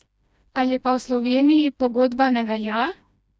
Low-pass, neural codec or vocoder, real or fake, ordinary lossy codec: none; codec, 16 kHz, 1 kbps, FreqCodec, smaller model; fake; none